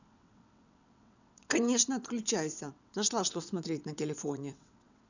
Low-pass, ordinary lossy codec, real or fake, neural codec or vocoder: 7.2 kHz; none; fake; vocoder, 22.05 kHz, 80 mel bands, WaveNeXt